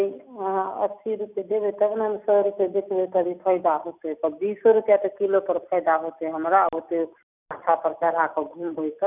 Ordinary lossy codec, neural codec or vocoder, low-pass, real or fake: none; none; 3.6 kHz; real